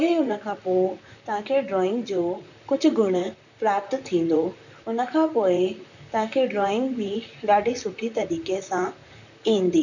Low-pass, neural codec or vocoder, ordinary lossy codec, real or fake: 7.2 kHz; vocoder, 44.1 kHz, 128 mel bands, Pupu-Vocoder; none; fake